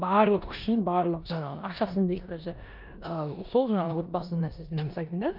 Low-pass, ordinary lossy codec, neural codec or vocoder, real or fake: 5.4 kHz; none; codec, 16 kHz in and 24 kHz out, 0.9 kbps, LongCat-Audio-Codec, four codebook decoder; fake